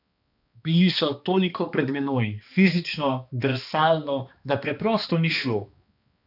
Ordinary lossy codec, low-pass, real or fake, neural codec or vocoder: none; 5.4 kHz; fake; codec, 16 kHz, 2 kbps, X-Codec, HuBERT features, trained on balanced general audio